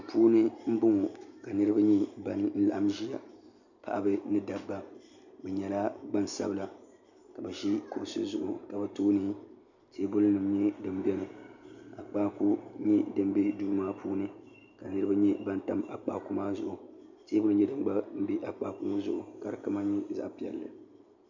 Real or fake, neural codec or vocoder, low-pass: real; none; 7.2 kHz